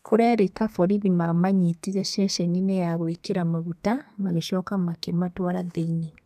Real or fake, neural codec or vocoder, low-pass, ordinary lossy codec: fake; codec, 32 kHz, 1.9 kbps, SNAC; 14.4 kHz; none